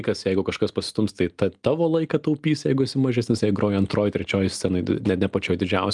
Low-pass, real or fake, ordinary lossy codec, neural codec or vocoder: 10.8 kHz; real; Opus, 32 kbps; none